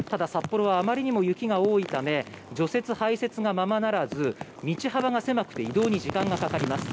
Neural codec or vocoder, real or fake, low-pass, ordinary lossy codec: none; real; none; none